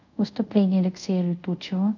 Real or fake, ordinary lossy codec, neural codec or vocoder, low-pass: fake; none; codec, 24 kHz, 0.5 kbps, DualCodec; 7.2 kHz